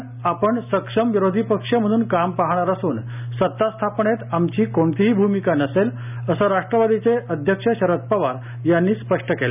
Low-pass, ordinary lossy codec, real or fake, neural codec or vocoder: 3.6 kHz; none; real; none